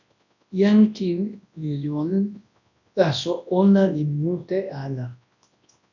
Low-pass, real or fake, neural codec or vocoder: 7.2 kHz; fake; codec, 24 kHz, 0.9 kbps, WavTokenizer, large speech release